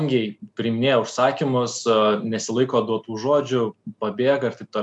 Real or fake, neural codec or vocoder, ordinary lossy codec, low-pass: real; none; MP3, 96 kbps; 10.8 kHz